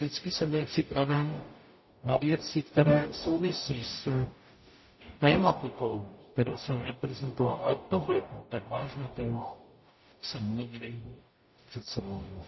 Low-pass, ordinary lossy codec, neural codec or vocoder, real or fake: 7.2 kHz; MP3, 24 kbps; codec, 44.1 kHz, 0.9 kbps, DAC; fake